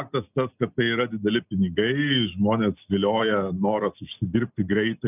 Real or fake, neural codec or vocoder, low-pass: real; none; 3.6 kHz